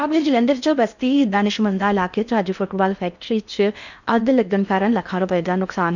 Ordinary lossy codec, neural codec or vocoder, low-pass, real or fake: none; codec, 16 kHz in and 24 kHz out, 0.6 kbps, FocalCodec, streaming, 4096 codes; 7.2 kHz; fake